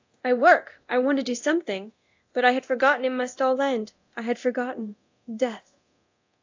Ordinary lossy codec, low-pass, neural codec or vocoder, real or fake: AAC, 48 kbps; 7.2 kHz; codec, 24 kHz, 0.9 kbps, DualCodec; fake